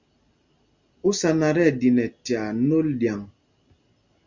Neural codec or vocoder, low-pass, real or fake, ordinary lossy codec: none; 7.2 kHz; real; Opus, 64 kbps